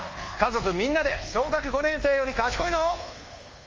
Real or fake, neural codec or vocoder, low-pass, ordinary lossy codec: fake; codec, 24 kHz, 1.2 kbps, DualCodec; 7.2 kHz; Opus, 32 kbps